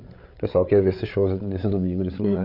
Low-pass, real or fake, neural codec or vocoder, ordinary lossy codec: 5.4 kHz; fake; codec, 16 kHz, 8 kbps, FreqCodec, larger model; none